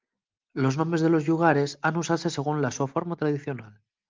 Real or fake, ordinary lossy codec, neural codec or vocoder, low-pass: real; Opus, 24 kbps; none; 7.2 kHz